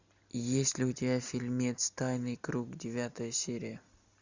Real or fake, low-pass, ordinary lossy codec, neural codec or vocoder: real; 7.2 kHz; Opus, 64 kbps; none